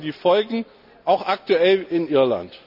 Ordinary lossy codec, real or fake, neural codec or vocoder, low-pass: none; real; none; 5.4 kHz